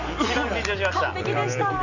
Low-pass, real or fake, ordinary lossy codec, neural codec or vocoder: 7.2 kHz; real; none; none